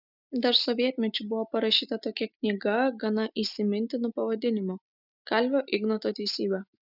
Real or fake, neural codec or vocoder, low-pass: real; none; 5.4 kHz